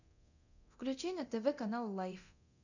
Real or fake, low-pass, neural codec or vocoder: fake; 7.2 kHz; codec, 24 kHz, 0.9 kbps, DualCodec